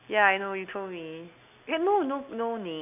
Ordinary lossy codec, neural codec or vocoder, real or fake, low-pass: none; none; real; 3.6 kHz